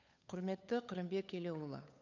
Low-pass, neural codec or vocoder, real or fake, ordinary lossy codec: 7.2 kHz; codec, 16 kHz, 8 kbps, FunCodec, trained on Chinese and English, 25 frames a second; fake; none